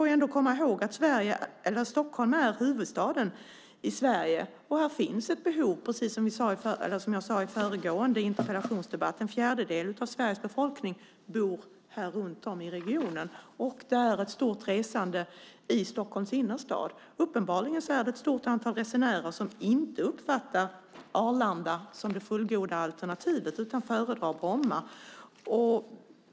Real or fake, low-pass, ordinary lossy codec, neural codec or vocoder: real; none; none; none